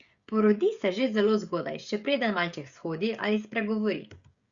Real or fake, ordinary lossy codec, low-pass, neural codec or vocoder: fake; Opus, 64 kbps; 7.2 kHz; codec, 16 kHz, 16 kbps, FreqCodec, smaller model